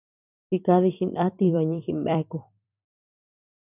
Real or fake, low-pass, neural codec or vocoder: fake; 3.6 kHz; vocoder, 44.1 kHz, 128 mel bands every 256 samples, BigVGAN v2